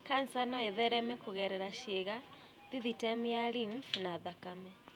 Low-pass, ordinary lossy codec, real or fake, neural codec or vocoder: 19.8 kHz; none; fake; vocoder, 48 kHz, 128 mel bands, Vocos